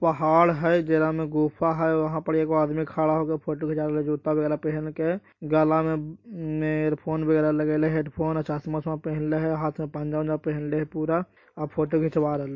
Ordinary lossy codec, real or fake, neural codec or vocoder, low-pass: MP3, 32 kbps; real; none; 7.2 kHz